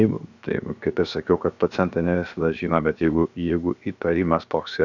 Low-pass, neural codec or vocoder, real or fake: 7.2 kHz; codec, 16 kHz, 0.7 kbps, FocalCodec; fake